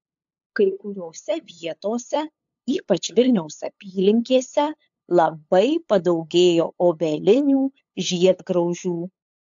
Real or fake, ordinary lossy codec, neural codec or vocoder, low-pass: fake; AAC, 64 kbps; codec, 16 kHz, 8 kbps, FunCodec, trained on LibriTTS, 25 frames a second; 7.2 kHz